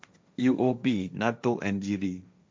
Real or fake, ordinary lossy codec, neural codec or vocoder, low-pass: fake; none; codec, 16 kHz, 1.1 kbps, Voila-Tokenizer; none